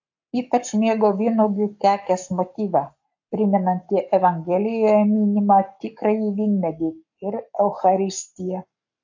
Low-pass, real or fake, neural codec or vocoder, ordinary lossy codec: 7.2 kHz; fake; codec, 44.1 kHz, 7.8 kbps, Pupu-Codec; AAC, 48 kbps